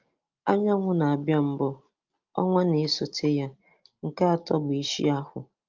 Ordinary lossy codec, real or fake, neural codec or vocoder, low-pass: Opus, 24 kbps; real; none; 7.2 kHz